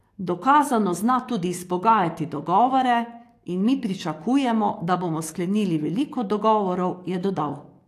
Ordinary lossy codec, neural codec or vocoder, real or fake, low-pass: AAC, 64 kbps; codec, 44.1 kHz, 7.8 kbps, DAC; fake; 14.4 kHz